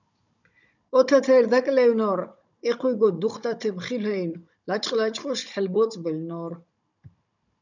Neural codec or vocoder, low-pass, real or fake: codec, 16 kHz, 16 kbps, FunCodec, trained on Chinese and English, 50 frames a second; 7.2 kHz; fake